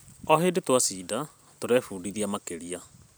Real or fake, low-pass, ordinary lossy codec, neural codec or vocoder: fake; none; none; vocoder, 44.1 kHz, 128 mel bands every 256 samples, BigVGAN v2